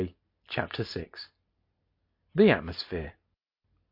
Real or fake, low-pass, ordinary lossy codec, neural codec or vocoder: real; 5.4 kHz; MP3, 32 kbps; none